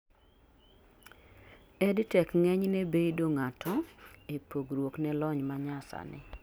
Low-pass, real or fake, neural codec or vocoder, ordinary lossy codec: none; real; none; none